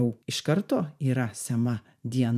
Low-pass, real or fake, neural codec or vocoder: 14.4 kHz; fake; vocoder, 44.1 kHz, 128 mel bands every 512 samples, BigVGAN v2